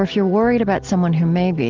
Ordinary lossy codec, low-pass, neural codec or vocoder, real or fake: Opus, 16 kbps; 7.2 kHz; none; real